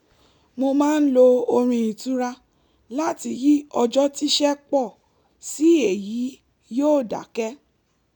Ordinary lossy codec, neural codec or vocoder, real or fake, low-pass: none; none; real; 19.8 kHz